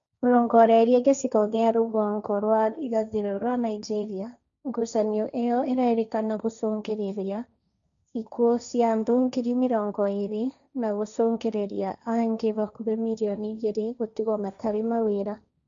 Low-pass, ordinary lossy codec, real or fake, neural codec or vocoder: 7.2 kHz; none; fake; codec, 16 kHz, 1.1 kbps, Voila-Tokenizer